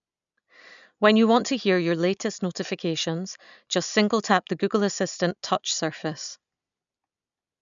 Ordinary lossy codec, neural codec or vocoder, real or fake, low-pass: none; none; real; 7.2 kHz